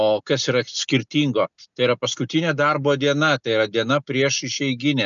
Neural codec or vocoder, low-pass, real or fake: none; 7.2 kHz; real